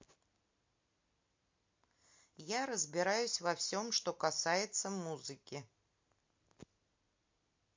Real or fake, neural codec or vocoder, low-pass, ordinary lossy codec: real; none; 7.2 kHz; MP3, 48 kbps